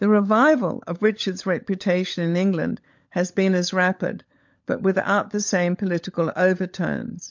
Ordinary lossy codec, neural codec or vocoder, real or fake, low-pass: MP3, 48 kbps; codec, 16 kHz, 16 kbps, FunCodec, trained on LibriTTS, 50 frames a second; fake; 7.2 kHz